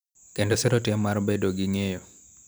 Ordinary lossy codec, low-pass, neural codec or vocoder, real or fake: none; none; vocoder, 44.1 kHz, 128 mel bands every 256 samples, BigVGAN v2; fake